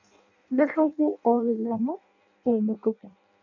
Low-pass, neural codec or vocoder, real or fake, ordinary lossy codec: 7.2 kHz; codec, 16 kHz in and 24 kHz out, 0.6 kbps, FireRedTTS-2 codec; fake; none